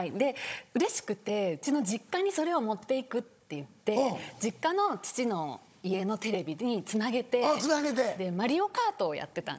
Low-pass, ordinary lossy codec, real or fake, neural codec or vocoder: none; none; fake; codec, 16 kHz, 16 kbps, FunCodec, trained on Chinese and English, 50 frames a second